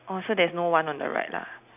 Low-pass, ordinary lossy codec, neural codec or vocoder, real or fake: 3.6 kHz; none; none; real